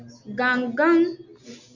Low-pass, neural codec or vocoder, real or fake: 7.2 kHz; none; real